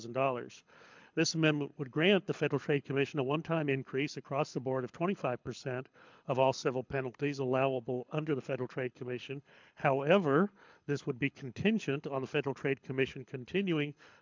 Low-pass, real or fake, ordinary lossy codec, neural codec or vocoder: 7.2 kHz; fake; MP3, 64 kbps; codec, 24 kHz, 6 kbps, HILCodec